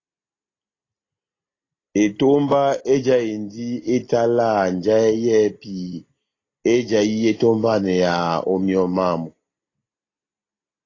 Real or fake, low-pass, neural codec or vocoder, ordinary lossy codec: real; 7.2 kHz; none; AAC, 32 kbps